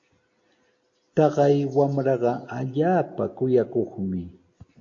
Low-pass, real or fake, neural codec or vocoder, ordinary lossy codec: 7.2 kHz; real; none; AAC, 48 kbps